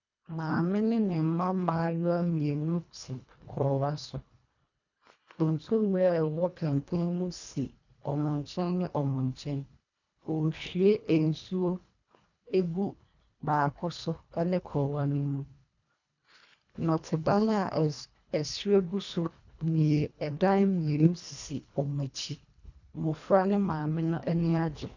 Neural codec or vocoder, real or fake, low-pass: codec, 24 kHz, 1.5 kbps, HILCodec; fake; 7.2 kHz